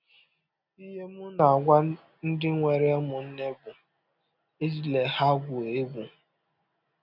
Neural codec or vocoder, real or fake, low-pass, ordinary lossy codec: none; real; 5.4 kHz; none